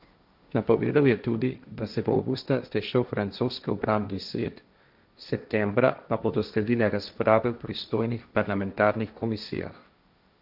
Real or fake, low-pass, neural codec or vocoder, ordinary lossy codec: fake; 5.4 kHz; codec, 16 kHz, 1.1 kbps, Voila-Tokenizer; none